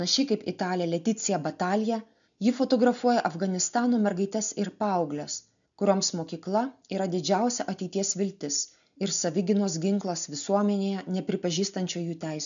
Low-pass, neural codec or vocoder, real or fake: 7.2 kHz; none; real